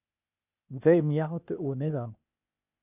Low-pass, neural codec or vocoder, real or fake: 3.6 kHz; codec, 16 kHz, 0.8 kbps, ZipCodec; fake